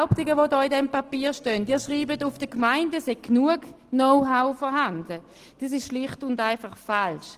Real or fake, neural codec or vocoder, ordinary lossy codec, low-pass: real; none; Opus, 16 kbps; 14.4 kHz